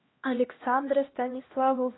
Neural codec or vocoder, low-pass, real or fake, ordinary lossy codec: codec, 16 kHz, 1 kbps, X-Codec, HuBERT features, trained on LibriSpeech; 7.2 kHz; fake; AAC, 16 kbps